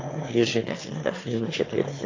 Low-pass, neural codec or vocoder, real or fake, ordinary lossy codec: 7.2 kHz; autoencoder, 22.05 kHz, a latent of 192 numbers a frame, VITS, trained on one speaker; fake; AAC, 32 kbps